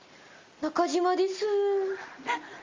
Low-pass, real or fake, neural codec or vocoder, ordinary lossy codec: 7.2 kHz; real; none; Opus, 32 kbps